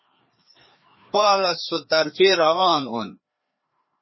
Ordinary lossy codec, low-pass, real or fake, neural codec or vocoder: MP3, 24 kbps; 7.2 kHz; fake; codec, 16 kHz, 2 kbps, FreqCodec, larger model